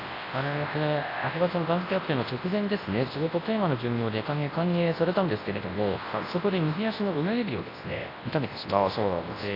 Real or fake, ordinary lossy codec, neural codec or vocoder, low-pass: fake; AAC, 24 kbps; codec, 24 kHz, 0.9 kbps, WavTokenizer, large speech release; 5.4 kHz